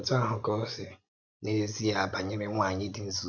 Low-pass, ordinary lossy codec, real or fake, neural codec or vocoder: 7.2 kHz; none; fake; vocoder, 24 kHz, 100 mel bands, Vocos